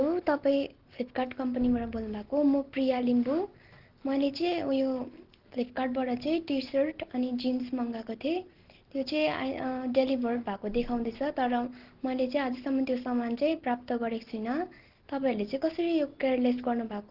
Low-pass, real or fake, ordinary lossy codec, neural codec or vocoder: 5.4 kHz; real; Opus, 16 kbps; none